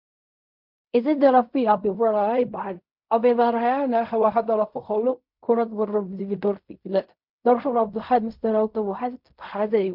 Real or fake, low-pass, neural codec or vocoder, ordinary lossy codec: fake; 5.4 kHz; codec, 16 kHz in and 24 kHz out, 0.4 kbps, LongCat-Audio-Codec, fine tuned four codebook decoder; MP3, 48 kbps